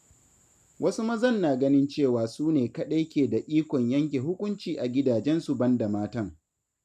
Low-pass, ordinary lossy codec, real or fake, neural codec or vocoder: 14.4 kHz; none; real; none